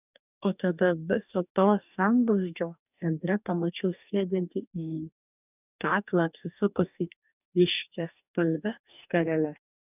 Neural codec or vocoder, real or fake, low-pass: codec, 44.1 kHz, 2.6 kbps, DAC; fake; 3.6 kHz